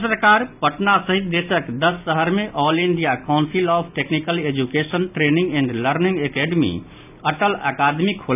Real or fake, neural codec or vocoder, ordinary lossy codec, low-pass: real; none; none; 3.6 kHz